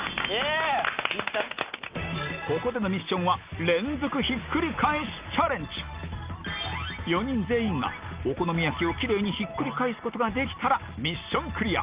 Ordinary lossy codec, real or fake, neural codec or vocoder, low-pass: Opus, 32 kbps; real; none; 3.6 kHz